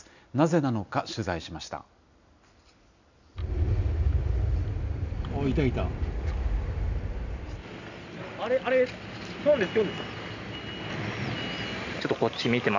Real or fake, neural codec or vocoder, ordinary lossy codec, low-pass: real; none; none; 7.2 kHz